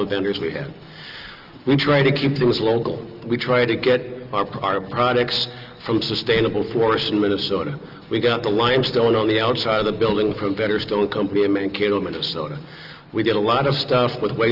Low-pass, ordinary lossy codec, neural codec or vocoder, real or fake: 5.4 kHz; Opus, 24 kbps; none; real